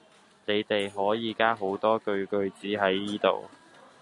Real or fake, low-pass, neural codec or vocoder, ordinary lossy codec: real; 10.8 kHz; none; MP3, 96 kbps